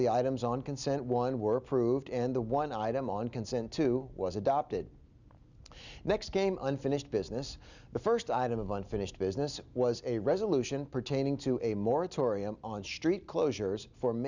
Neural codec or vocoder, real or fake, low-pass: none; real; 7.2 kHz